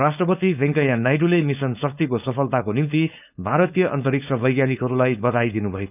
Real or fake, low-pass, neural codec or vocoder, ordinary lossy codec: fake; 3.6 kHz; codec, 16 kHz, 4.8 kbps, FACodec; none